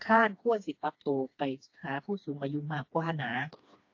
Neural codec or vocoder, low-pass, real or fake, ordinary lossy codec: codec, 16 kHz, 2 kbps, FreqCodec, smaller model; 7.2 kHz; fake; AAC, 48 kbps